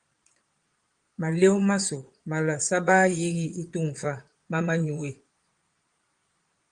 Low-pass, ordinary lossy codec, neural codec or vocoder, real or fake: 9.9 kHz; Opus, 24 kbps; vocoder, 22.05 kHz, 80 mel bands, Vocos; fake